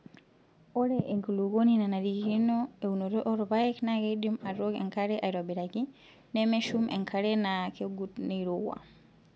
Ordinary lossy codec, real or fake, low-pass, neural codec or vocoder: none; real; none; none